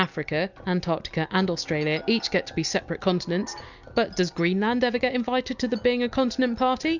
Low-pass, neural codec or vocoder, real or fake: 7.2 kHz; none; real